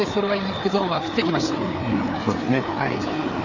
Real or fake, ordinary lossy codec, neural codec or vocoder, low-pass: fake; none; codec, 16 kHz, 4 kbps, FreqCodec, larger model; 7.2 kHz